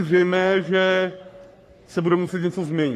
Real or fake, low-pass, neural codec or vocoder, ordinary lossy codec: fake; 14.4 kHz; codec, 44.1 kHz, 3.4 kbps, Pupu-Codec; AAC, 48 kbps